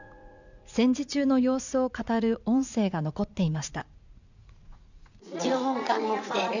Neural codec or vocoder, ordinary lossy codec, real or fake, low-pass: vocoder, 44.1 kHz, 80 mel bands, Vocos; none; fake; 7.2 kHz